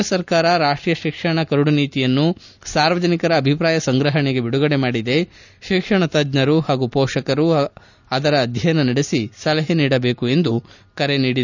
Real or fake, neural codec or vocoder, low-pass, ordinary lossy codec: real; none; 7.2 kHz; none